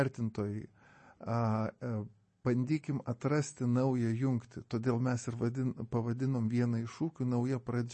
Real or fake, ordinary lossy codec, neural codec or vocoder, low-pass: real; MP3, 32 kbps; none; 10.8 kHz